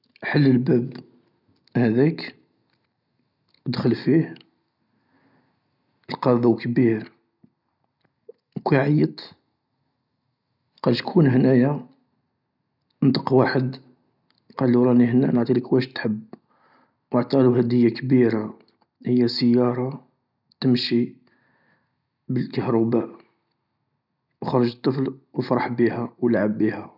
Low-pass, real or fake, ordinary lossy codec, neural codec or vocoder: 5.4 kHz; real; none; none